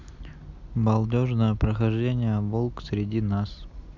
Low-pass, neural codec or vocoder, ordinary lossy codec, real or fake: 7.2 kHz; none; none; real